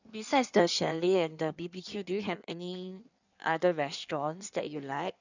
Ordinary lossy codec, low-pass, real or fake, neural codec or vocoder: none; 7.2 kHz; fake; codec, 16 kHz in and 24 kHz out, 1.1 kbps, FireRedTTS-2 codec